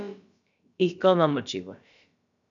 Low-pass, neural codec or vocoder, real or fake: 7.2 kHz; codec, 16 kHz, about 1 kbps, DyCAST, with the encoder's durations; fake